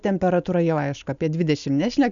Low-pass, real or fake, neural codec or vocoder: 7.2 kHz; real; none